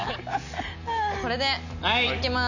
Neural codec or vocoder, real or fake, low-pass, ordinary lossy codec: none; real; 7.2 kHz; none